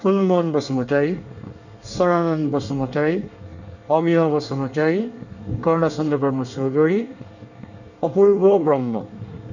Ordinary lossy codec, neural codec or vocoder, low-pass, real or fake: none; codec, 24 kHz, 1 kbps, SNAC; 7.2 kHz; fake